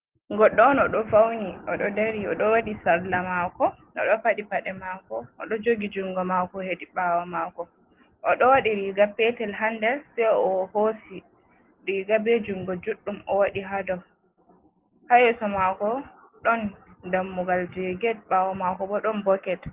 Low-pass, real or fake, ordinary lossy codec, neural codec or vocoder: 3.6 kHz; real; Opus, 16 kbps; none